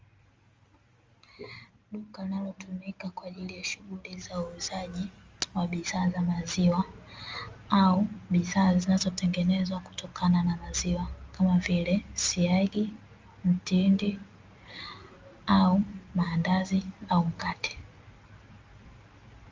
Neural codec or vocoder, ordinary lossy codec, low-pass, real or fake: none; Opus, 32 kbps; 7.2 kHz; real